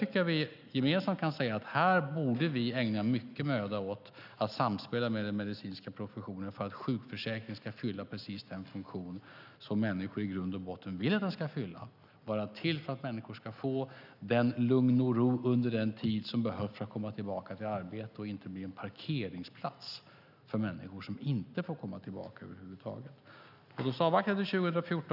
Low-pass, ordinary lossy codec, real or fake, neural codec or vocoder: 5.4 kHz; none; real; none